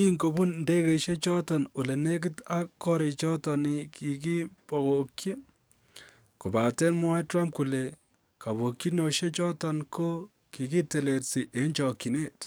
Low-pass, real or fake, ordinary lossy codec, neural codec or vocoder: none; fake; none; codec, 44.1 kHz, 7.8 kbps, DAC